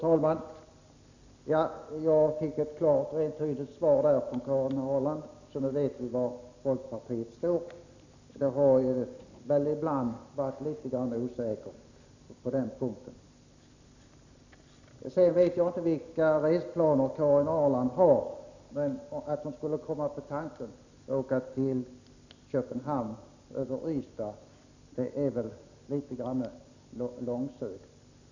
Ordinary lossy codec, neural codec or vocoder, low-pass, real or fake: none; none; 7.2 kHz; real